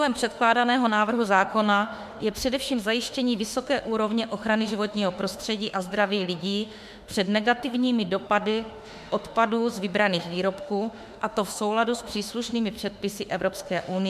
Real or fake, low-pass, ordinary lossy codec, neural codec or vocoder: fake; 14.4 kHz; MP3, 96 kbps; autoencoder, 48 kHz, 32 numbers a frame, DAC-VAE, trained on Japanese speech